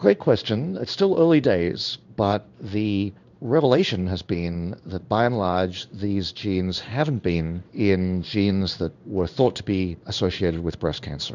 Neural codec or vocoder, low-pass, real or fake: codec, 16 kHz in and 24 kHz out, 1 kbps, XY-Tokenizer; 7.2 kHz; fake